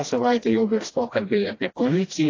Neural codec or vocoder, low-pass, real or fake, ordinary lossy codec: codec, 16 kHz, 1 kbps, FreqCodec, smaller model; 7.2 kHz; fake; AAC, 32 kbps